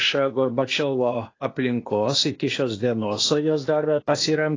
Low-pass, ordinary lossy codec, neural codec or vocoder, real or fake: 7.2 kHz; AAC, 32 kbps; codec, 16 kHz, 0.8 kbps, ZipCodec; fake